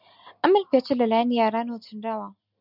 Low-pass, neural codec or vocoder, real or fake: 5.4 kHz; none; real